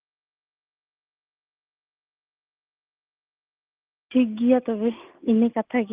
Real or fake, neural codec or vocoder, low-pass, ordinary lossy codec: real; none; 3.6 kHz; Opus, 24 kbps